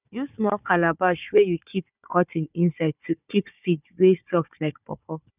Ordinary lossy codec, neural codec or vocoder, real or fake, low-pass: none; codec, 16 kHz, 4 kbps, FunCodec, trained on Chinese and English, 50 frames a second; fake; 3.6 kHz